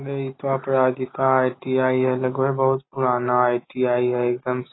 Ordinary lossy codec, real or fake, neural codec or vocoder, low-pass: AAC, 16 kbps; real; none; 7.2 kHz